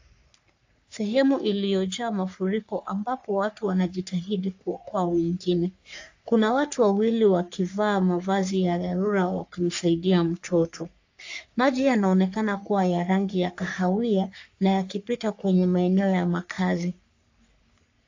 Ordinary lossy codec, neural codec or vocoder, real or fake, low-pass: AAC, 48 kbps; codec, 44.1 kHz, 3.4 kbps, Pupu-Codec; fake; 7.2 kHz